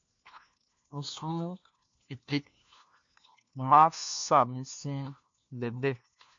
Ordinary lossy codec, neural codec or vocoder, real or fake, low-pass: MP3, 64 kbps; codec, 16 kHz, 1 kbps, FunCodec, trained on LibriTTS, 50 frames a second; fake; 7.2 kHz